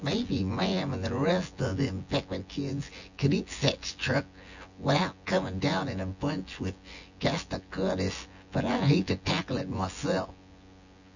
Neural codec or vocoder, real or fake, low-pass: vocoder, 24 kHz, 100 mel bands, Vocos; fake; 7.2 kHz